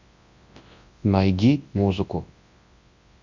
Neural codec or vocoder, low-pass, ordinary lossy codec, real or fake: codec, 24 kHz, 0.9 kbps, WavTokenizer, large speech release; 7.2 kHz; Opus, 64 kbps; fake